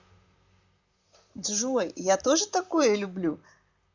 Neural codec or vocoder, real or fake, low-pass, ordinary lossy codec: vocoder, 44.1 kHz, 128 mel bands, Pupu-Vocoder; fake; 7.2 kHz; Opus, 64 kbps